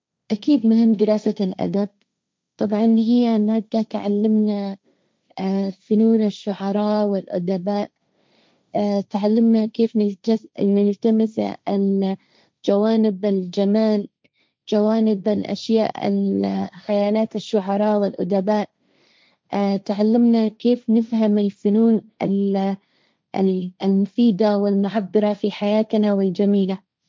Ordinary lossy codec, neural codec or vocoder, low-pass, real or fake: none; codec, 16 kHz, 1.1 kbps, Voila-Tokenizer; none; fake